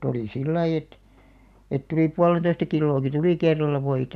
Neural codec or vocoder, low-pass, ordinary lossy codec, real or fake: none; 14.4 kHz; none; real